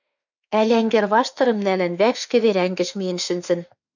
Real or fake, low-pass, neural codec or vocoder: fake; 7.2 kHz; autoencoder, 48 kHz, 32 numbers a frame, DAC-VAE, trained on Japanese speech